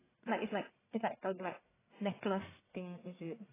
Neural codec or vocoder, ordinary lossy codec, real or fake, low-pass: codec, 44.1 kHz, 1.7 kbps, Pupu-Codec; AAC, 16 kbps; fake; 3.6 kHz